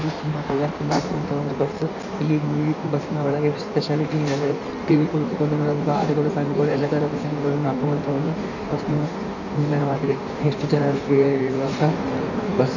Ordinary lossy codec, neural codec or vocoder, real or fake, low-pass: none; codec, 16 kHz in and 24 kHz out, 1.1 kbps, FireRedTTS-2 codec; fake; 7.2 kHz